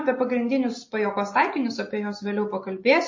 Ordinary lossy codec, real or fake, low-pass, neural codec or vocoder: MP3, 32 kbps; real; 7.2 kHz; none